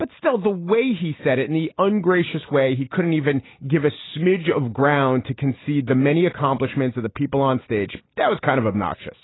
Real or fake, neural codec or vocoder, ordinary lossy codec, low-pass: real; none; AAC, 16 kbps; 7.2 kHz